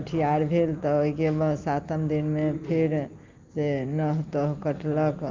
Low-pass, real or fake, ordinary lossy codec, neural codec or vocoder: 7.2 kHz; real; Opus, 32 kbps; none